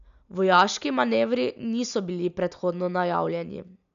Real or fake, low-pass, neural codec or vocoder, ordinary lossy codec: real; 7.2 kHz; none; none